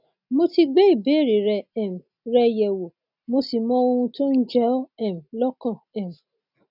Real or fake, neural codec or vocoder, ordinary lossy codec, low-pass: real; none; none; 5.4 kHz